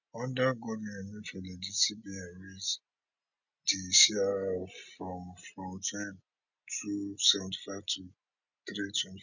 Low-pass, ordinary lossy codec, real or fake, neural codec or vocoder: 7.2 kHz; none; real; none